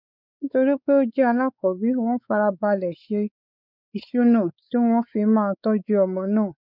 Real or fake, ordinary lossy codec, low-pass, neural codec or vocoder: fake; none; 5.4 kHz; codec, 16 kHz, 4 kbps, X-Codec, WavLM features, trained on Multilingual LibriSpeech